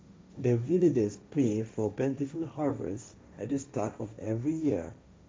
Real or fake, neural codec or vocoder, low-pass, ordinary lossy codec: fake; codec, 16 kHz, 1.1 kbps, Voila-Tokenizer; none; none